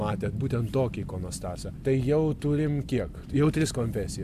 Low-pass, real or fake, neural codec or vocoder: 14.4 kHz; real; none